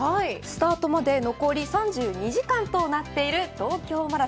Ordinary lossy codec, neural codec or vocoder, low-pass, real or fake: none; none; none; real